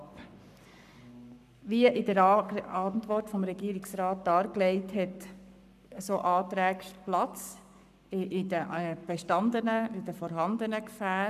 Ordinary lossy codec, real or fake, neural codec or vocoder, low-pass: none; fake; codec, 44.1 kHz, 7.8 kbps, Pupu-Codec; 14.4 kHz